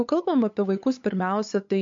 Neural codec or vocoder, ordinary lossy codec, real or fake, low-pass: codec, 16 kHz, 8 kbps, FunCodec, trained on LibriTTS, 25 frames a second; MP3, 48 kbps; fake; 7.2 kHz